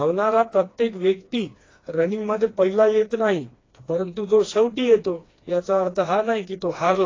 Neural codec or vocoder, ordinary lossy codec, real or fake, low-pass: codec, 16 kHz, 2 kbps, FreqCodec, smaller model; AAC, 32 kbps; fake; 7.2 kHz